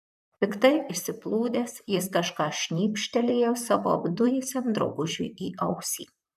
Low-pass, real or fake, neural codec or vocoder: 14.4 kHz; fake; vocoder, 44.1 kHz, 128 mel bands, Pupu-Vocoder